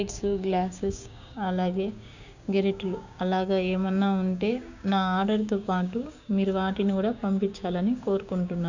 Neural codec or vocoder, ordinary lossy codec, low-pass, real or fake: codec, 16 kHz, 6 kbps, DAC; none; 7.2 kHz; fake